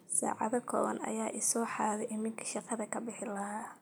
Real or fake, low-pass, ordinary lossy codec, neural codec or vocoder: real; none; none; none